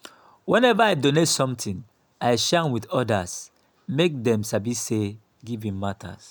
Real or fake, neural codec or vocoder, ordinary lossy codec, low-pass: real; none; none; none